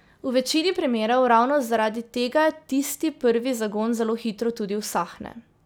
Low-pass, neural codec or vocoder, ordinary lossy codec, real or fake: none; none; none; real